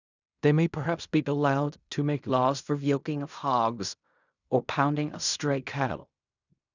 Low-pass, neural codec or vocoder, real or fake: 7.2 kHz; codec, 16 kHz in and 24 kHz out, 0.4 kbps, LongCat-Audio-Codec, fine tuned four codebook decoder; fake